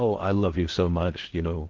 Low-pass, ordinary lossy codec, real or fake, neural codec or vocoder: 7.2 kHz; Opus, 16 kbps; fake; codec, 16 kHz in and 24 kHz out, 0.6 kbps, FocalCodec, streaming, 4096 codes